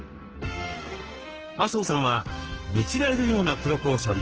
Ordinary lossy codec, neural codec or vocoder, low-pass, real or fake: Opus, 16 kbps; codec, 44.1 kHz, 2.6 kbps, SNAC; 7.2 kHz; fake